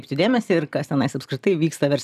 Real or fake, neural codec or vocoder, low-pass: real; none; 14.4 kHz